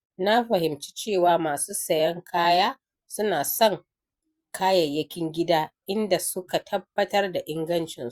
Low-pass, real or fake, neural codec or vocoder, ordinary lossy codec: 19.8 kHz; fake; vocoder, 44.1 kHz, 128 mel bands every 512 samples, BigVGAN v2; Opus, 64 kbps